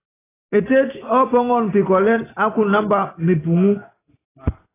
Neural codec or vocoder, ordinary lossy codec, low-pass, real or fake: none; AAC, 16 kbps; 3.6 kHz; real